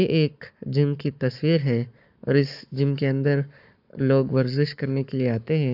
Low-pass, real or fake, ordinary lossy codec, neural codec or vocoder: 5.4 kHz; fake; none; codec, 44.1 kHz, 7.8 kbps, Pupu-Codec